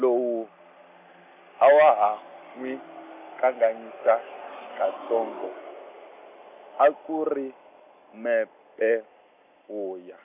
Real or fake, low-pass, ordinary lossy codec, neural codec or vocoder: real; 3.6 kHz; none; none